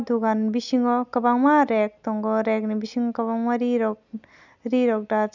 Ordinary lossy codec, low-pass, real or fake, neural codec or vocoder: none; 7.2 kHz; real; none